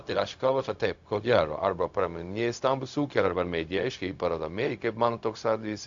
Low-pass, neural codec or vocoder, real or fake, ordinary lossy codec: 7.2 kHz; codec, 16 kHz, 0.4 kbps, LongCat-Audio-Codec; fake; AAC, 64 kbps